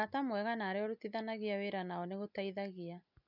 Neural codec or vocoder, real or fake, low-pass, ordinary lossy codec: none; real; 5.4 kHz; none